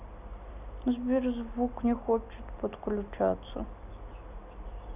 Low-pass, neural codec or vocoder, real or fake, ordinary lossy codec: 3.6 kHz; none; real; none